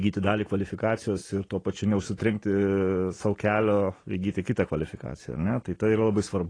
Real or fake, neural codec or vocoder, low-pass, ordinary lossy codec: real; none; 9.9 kHz; AAC, 32 kbps